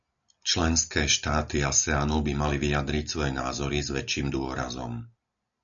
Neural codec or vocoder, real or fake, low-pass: none; real; 7.2 kHz